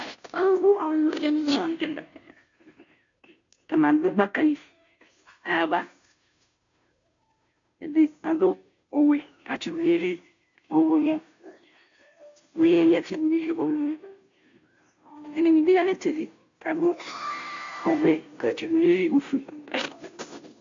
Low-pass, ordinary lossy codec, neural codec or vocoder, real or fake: 7.2 kHz; MP3, 64 kbps; codec, 16 kHz, 0.5 kbps, FunCodec, trained on Chinese and English, 25 frames a second; fake